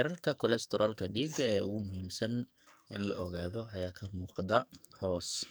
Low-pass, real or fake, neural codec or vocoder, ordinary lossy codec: none; fake; codec, 44.1 kHz, 2.6 kbps, SNAC; none